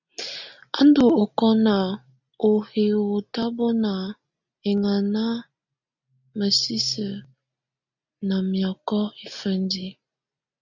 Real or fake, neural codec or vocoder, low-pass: real; none; 7.2 kHz